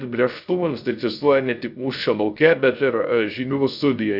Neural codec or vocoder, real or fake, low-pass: codec, 16 kHz, 0.3 kbps, FocalCodec; fake; 5.4 kHz